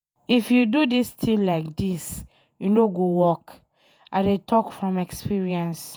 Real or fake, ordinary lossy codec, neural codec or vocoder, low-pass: fake; none; vocoder, 48 kHz, 128 mel bands, Vocos; none